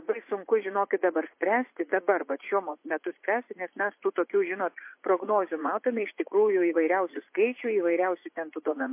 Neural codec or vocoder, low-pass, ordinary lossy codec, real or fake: vocoder, 44.1 kHz, 80 mel bands, Vocos; 3.6 kHz; MP3, 24 kbps; fake